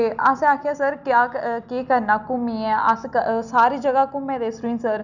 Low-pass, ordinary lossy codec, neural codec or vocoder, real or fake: 7.2 kHz; none; none; real